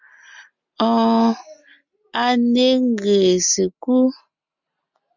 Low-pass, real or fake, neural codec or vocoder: 7.2 kHz; real; none